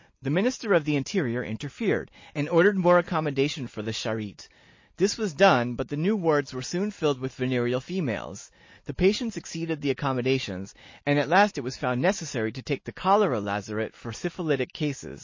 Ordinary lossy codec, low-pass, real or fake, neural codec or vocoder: MP3, 32 kbps; 7.2 kHz; real; none